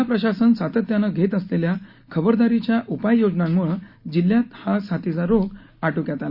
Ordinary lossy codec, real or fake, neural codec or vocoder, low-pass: Opus, 64 kbps; real; none; 5.4 kHz